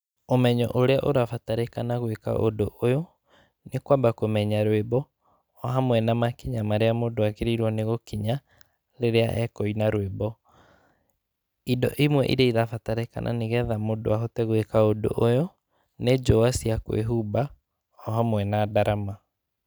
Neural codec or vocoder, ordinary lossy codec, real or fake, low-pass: none; none; real; none